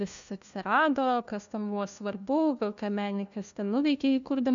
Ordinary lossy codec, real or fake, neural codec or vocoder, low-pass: MP3, 96 kbps; fake; codec, 16 kHz, 1 kbps, FunCodec, trained on LibriTTS, 50 frames a second; 7.2 kHz